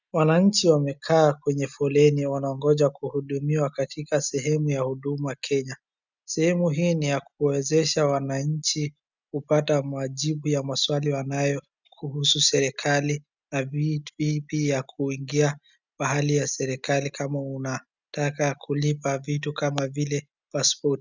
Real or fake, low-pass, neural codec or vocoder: real; 7.2 kHz; none